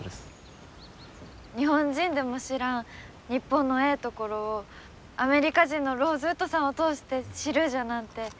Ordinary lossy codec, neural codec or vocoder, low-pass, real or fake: none; none; none; real